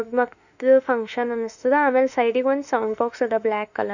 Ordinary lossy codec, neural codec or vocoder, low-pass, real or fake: none; autoencoder, 48 kHz, 32 numbers a frame, DAC-VAE, trained on Japanese speech; 7.2 kHz; fake